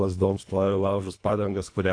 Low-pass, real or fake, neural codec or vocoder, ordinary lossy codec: 9.9 kHz; fake; codec, 24 kHz, 1.5 kbps, HILCodec; AAC, 48 kbps